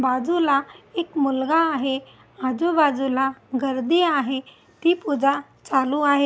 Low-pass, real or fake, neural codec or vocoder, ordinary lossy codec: none; real; none; none